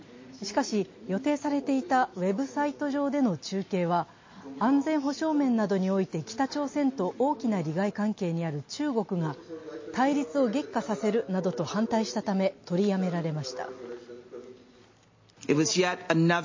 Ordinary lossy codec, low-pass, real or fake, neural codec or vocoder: MP3, 32 kbps; 7.2 kHz; real; none